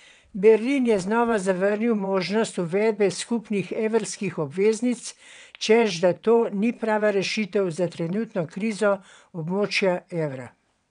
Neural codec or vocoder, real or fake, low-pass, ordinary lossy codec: vocoder, 22.05 kHz, 80 mel bands, WaveNeXt; fake; 9.9 kHz; none